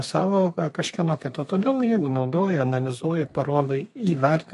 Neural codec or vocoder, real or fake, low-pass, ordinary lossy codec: codec, 32 kHz, 1.9 kbps, SNAC; fake; 14.4 kHz; MP3, 48 kbps